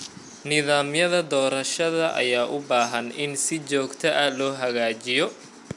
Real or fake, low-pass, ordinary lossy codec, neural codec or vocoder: real; 10.8 kHz; none; none